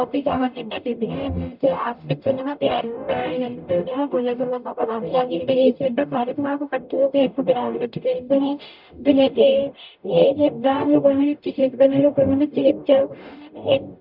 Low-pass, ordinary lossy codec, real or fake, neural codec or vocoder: 5.4 kHz; none; fake; codec, 44.1 kHz, 0.9 kbps, DAC